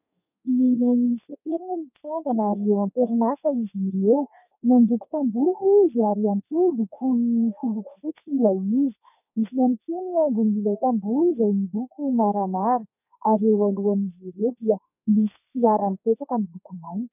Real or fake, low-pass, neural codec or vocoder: fake; 3.6 kHz; codec, 32 kHz, 1.9 kbps, SNAC